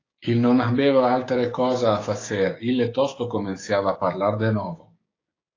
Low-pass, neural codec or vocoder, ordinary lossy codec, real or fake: 7.2 kHz; codec, 16 kHz, 6 kbps, DAC; AAC, 32 kbps; fake